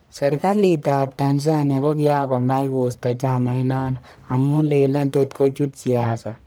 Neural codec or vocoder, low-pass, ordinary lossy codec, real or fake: codec, 44.1 kHz, 1.7 kbps, Pupu-Codec; none; none; fake